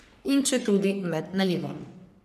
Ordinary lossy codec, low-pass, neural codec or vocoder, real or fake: MP3, 96 kbps; 14.4 kHz; codec, 44.1 kHz, 3.4 kbps, Pupu-Codec; fake